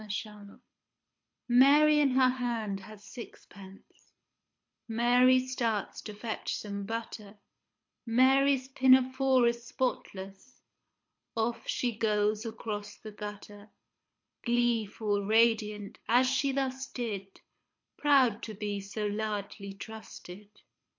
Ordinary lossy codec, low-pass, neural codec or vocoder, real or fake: MP3, 48 kbps; 7.2 kHz; codec, 24 kHz, 6 kbps, HILCodec; fake